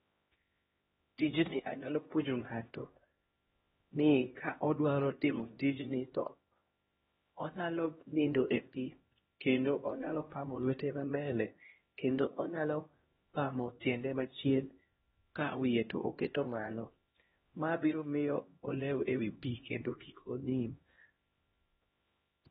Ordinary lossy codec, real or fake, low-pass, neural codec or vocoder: AAC, 16 kbps; fake; 7.2 kHz; codec, 16 kHz, 1 kbps, X-Codec, HuBERT features, trained on LibriSpeech